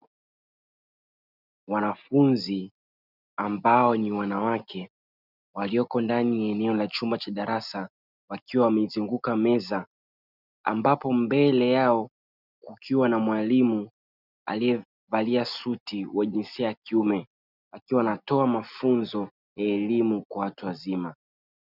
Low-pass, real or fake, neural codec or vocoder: 5.4 kHz; real; none